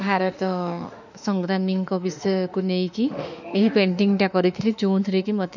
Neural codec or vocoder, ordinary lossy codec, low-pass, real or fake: autoencoder, 48 kHz, 32 numbers a frame, DAC-VAE, trained on Japanese speech; none; 7.2 kHz; fake